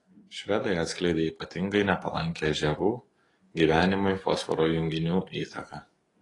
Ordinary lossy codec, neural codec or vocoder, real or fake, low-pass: AAC, 32 kbps; codec, 44.1 kHz, 7.8 kbps, DAC; fake; 10.8 kHz